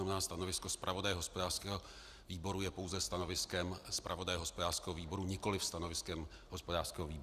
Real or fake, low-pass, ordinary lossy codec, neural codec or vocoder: real; 14.4 kHz; Opus, 64 kbps; none